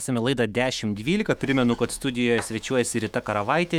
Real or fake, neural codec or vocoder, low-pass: fake; autoencoder, 48 kHz, 128 numbers a frame, DAC-VAE, trained on Japanese speech; 19.8 kHz